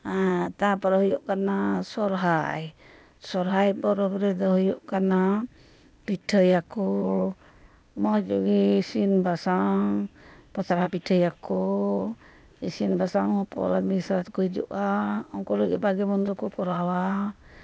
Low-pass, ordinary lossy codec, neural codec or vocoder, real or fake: none; none; codec, 16 kHz, 0.8 kbps, ZipCodec; fake